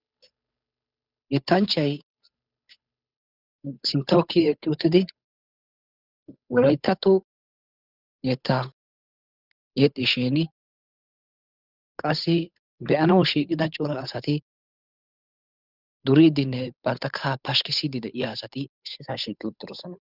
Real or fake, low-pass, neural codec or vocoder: fake; 5.4 kHz; codec, 16 kHz, 8 kbps, FunCodec, trained on Chinese and English, 25 frames a second